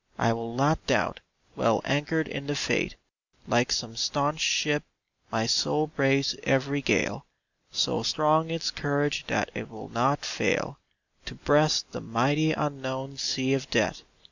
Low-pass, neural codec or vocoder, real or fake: 7.2 kHz; none; real